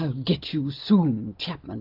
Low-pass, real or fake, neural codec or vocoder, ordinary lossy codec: 5.4 kHz; real; none; AAC, 48 kbps